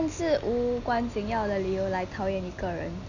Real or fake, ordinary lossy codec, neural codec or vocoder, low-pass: real; none; none; 7.2 kHz